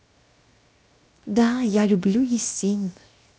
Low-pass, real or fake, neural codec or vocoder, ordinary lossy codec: none; fake; codec, 16 kHz, 0.7 kbps, FocalCodec; none